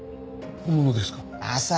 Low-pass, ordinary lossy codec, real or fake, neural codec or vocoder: none; none; real; none